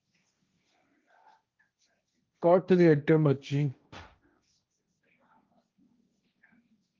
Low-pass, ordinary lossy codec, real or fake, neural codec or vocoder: 7.2 kHz; Opus, 32 kbps; fake; codec, 16 kHz, 1.1 kbps, Voila-Tokenizer